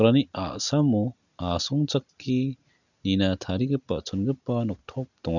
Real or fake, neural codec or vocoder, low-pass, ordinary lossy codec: real; none; 7.2 kHz; none